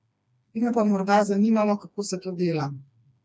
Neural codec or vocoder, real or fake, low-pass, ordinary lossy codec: codec, 16 kHz, 2 kbps, FreqCodec, smaller model; fake; none; none